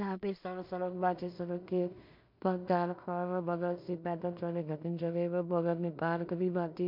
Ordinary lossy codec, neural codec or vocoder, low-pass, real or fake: MP3, 48 kbps; codec, 16 kHz in and 24 kHz out, 0.4 kbps, LongCat-Audio-Codec, two codebook decoder; 5.4 kHz; fake